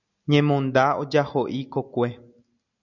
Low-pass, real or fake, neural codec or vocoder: 7.2 kHz; real; none